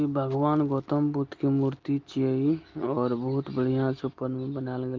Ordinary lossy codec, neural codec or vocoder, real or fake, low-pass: Opus, 32 kbps; none; real; 7.2 kHz